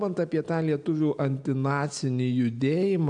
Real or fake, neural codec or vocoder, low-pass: real; none; 9.9 kHz